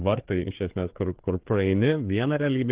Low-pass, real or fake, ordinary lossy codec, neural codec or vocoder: 3.6 kHz; fake; Opus, 16 kbps; codec, 16 kHz, 4 kbps, FreqCodec, larger model